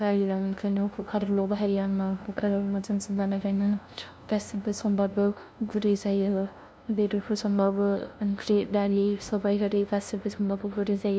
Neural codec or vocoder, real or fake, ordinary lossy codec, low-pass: codec, 16 kHz, 0.5 kbps, FunCodec, trained on LibriTTS, 25 frames a second; fake; none; none